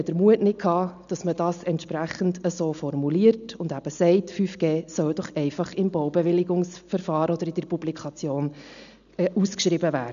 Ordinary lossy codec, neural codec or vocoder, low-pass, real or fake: none; none; 7.2 kHz; real